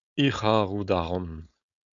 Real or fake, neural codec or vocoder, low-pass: fake; codec, 16 kHz, 4.8 kbps, FACodec; 7.2 kHz